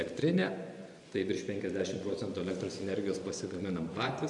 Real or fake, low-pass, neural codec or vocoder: fake; 10.8 kHz; vocoder, 24 kHz, 100 mel bands, Vocos